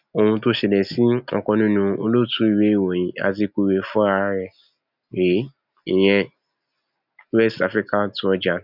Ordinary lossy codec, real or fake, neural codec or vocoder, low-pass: none; real; none; 5.4 kHz